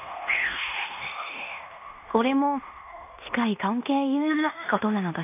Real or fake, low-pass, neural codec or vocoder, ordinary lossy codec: fake; 3.6 kHz; codec, 16 kHz in and 24 kHz out, 0.9 kbps, LongCat-Audio-Codec, fine tuned four codebook decoder; AAC, 32 kbps